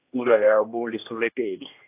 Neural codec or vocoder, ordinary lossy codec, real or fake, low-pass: codec, 16 kHz, 1 kbps, X-Codec, HuBERT features, trained on general audio; AAC, 32 kbps; fake; 3.6 kHz